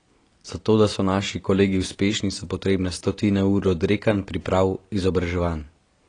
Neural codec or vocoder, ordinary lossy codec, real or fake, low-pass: none; AAC, 32 kbps; real; 9.9 kHz